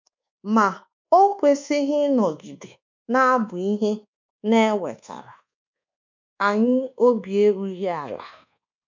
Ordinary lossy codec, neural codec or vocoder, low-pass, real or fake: MP3, 64 kbps; codec, 24 kHz, 1.2 kbps, DualCodec; 7.2 kHz; fake